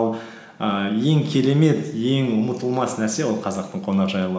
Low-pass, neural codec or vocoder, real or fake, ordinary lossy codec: none; none; real; none